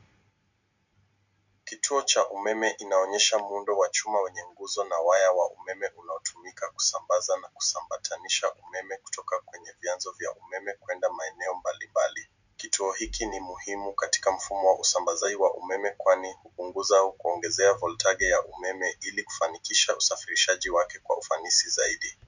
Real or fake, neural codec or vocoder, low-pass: real; none; 7.2 kHz